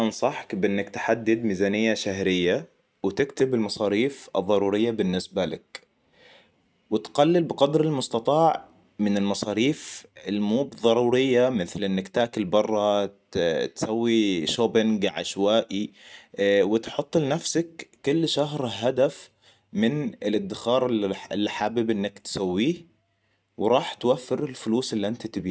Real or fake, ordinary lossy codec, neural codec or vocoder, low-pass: real; none; none; none